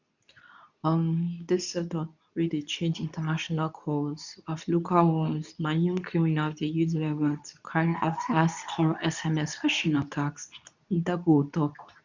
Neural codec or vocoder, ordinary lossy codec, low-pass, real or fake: codec, 24 kHz, 0.9 kbps, WavTokenizer, medium speech release version 2; none; 7.2 kHz; fake